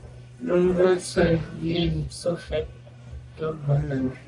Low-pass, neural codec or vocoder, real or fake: 10.8 kHz; codec, 44.1 kHz, 1.7 kbps, Pupu-Codec; fake